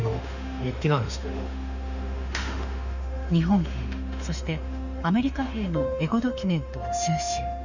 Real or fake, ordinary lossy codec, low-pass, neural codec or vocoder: fake; none; 7.2 kHz; autoencoder, 48 kHz, 32 numbers a frame, DAC-VAE, trained on Japanese speech